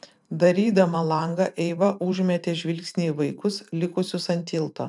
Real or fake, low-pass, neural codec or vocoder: fake; 10.8 kHz; vocoder, 44.1 kHz, 128 mel bands every 512 samples, BigVGAN v2